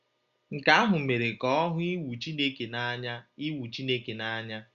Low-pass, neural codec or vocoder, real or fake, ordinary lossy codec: 7.2 kHz; none; real; Opus, 64 kbps